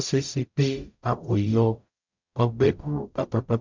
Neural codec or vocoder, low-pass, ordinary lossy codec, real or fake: codec, 44.1 kHz, 0.9 kbps, DAC; 7.2 kHz; MP3, 64 kbps; fake